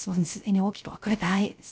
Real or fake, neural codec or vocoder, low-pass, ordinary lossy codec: fake; codec, 16 kHz, 0.3 kbps, FocalCodec; none; none